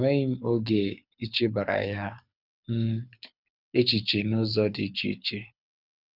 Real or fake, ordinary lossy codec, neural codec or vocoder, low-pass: fake; none; codec, 24 kHz, 6 kbps, HILCodec; 5.4 kHz